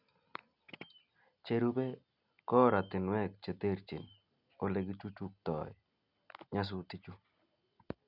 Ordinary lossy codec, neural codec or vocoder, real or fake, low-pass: none; none; real; 5.4 kHz